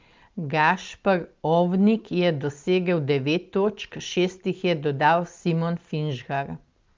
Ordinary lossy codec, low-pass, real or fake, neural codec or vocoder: Opus, 32 kbps; 7.2 kHz; real; none